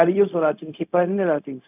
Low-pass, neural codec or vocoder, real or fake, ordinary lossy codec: 3.6 kHz; codec, 16 kHz, 0.4 kbps, LongCat-Audio-Codec; fake; none